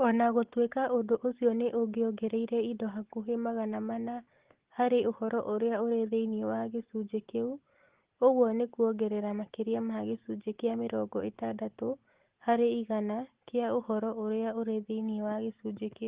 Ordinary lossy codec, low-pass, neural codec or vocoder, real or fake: Opus, 16 kbps; 3.6 kHz; none; real